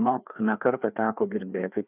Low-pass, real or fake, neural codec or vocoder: 3.6 kHz; fake; codec, 16 kHz, 2 kbps, FreqCodec, larger model